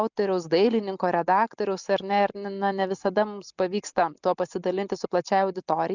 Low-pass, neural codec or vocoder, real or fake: 7.2 kHz; none; real